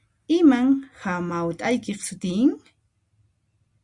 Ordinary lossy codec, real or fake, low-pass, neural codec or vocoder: Opus, 64 kbps; real; 10.8 kHz; none